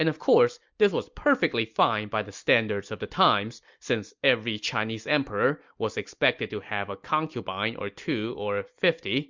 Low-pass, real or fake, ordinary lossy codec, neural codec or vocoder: 7.2 kHz; real; MP3, 64 kbps; none